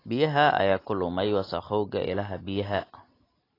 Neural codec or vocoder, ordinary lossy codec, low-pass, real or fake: none; AAC, 24 kbps; 5.4 kHz; real